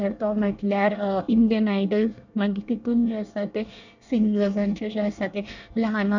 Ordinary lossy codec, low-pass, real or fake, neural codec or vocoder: none; 7.2 kHz; fake; codec, 24 kHz, 1 kbps, SNAC